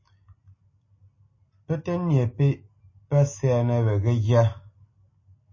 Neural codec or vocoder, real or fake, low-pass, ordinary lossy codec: none; real; 7.2 kHz; MP3, 32 kbps